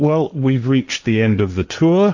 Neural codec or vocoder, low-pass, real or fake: codec, 16 kHz, 1.1 kbps, Voila-Tokenizer; 7.2 kHz; fake